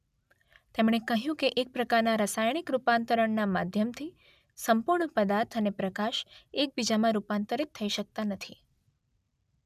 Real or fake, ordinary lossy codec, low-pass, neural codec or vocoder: real; none; 14.4 kHz; none